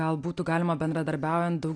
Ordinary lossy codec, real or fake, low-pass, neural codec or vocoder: AAC, 48 kbps; real; 9.9 kHz; none